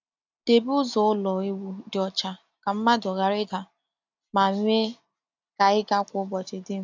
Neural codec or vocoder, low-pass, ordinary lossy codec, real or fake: none; 7.2 kHz; AAC, 48 kbps; real